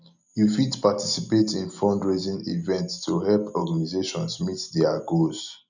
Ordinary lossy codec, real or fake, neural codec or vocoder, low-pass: none; real; none; 7.2 kHz